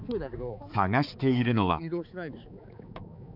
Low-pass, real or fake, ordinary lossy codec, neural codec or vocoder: 5.4 kHz; fake; none; codec, 16 kHz, 4 kbps, X-Codec, HuBERT features, trained on balanced general audio